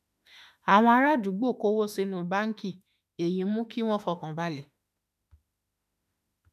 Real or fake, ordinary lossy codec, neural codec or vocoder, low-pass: fake; none; autoencoder, 48 kHz, 32 numbers a frame, DAC-VAE, trained on Japanese speech; 14.4 kHz